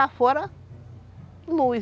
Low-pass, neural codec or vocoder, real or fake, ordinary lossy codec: none; none; real; none